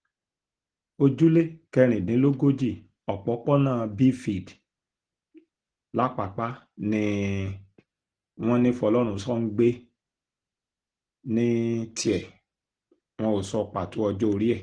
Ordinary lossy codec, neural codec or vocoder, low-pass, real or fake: Opus, 16 kbps; none; 9.9 kHz; real